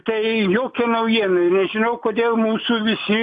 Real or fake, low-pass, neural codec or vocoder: real; 10.8 kHz; none